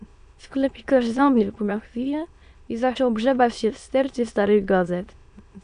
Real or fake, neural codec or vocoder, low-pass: fake; autoencoder, 22.05 kHz, a latent of 192 numbers a frame, VITS, trained on many speakers; 9.9 kHz